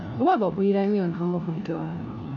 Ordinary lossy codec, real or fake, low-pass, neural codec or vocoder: none; fake; 7.2 kHz; codec, 16 kHz, 1 kbps, FunCodec, trained on LibriTTS, 50 frames a second